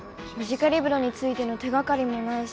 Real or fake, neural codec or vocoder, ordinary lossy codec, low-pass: real; none; none; none